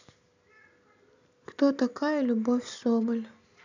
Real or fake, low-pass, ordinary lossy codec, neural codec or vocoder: real; 7.2 kHz; none; none